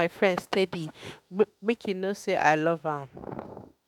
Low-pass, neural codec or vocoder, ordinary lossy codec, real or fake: 19.8 kHz; autoencoder, 48 kHz, 32 numbers a frame, DAC-VAE, trained on Japanese speech; none; fake